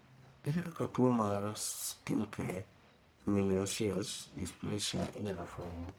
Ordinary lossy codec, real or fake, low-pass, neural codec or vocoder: none; fake; none; codec, 44.1 kHz, 1.7 kbps, Pupu-Codec